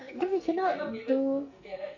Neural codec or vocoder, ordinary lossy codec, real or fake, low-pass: codec, 44.1 kHz, 2.6 kbps, SNAC; none; fake; 7.2 kHz